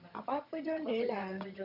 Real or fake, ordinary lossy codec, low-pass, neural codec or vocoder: fake; none; 5.4 kHz; vocoder, 22.05 kHz, 80 mel bands, HiFi-GAN